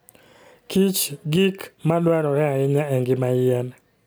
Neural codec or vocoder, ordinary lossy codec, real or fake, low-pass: none; none; real; none